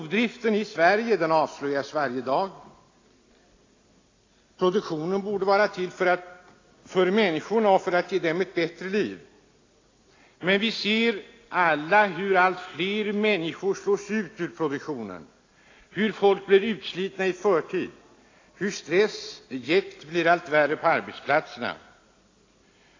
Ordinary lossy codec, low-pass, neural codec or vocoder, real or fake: AAC, 32 kbps; 7.2 kHz; none; real